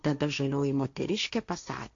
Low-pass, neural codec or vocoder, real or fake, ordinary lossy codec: 7.2 kHz; codec, 16 kHz, 1.1 kbps, Voila-Tokenizer; fake; AAC, 64 kbps